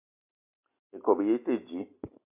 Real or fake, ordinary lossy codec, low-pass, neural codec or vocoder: real; MP3, 32 kbps; 3.6 kHz; none